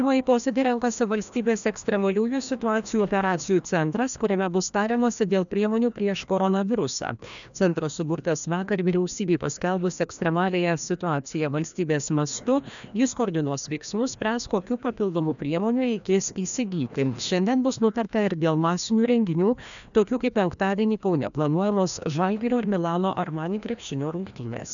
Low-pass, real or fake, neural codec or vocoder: 7.2 kHz; fake; codec, 16 kHz, 1 kbps, FreqCodec, larger model